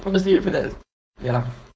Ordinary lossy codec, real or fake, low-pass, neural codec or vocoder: none; fake; none; codec, 16 kHz, 4.8 kbps, FACodec